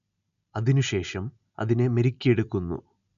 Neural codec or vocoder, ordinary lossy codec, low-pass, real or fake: none; MP3, 96 kbps; 7.2 kHz; real